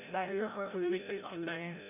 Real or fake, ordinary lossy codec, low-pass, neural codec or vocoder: fake; none; 3.6 kHz; codec, 16 kHz, 0.5 kbps, FreqCodec, larger model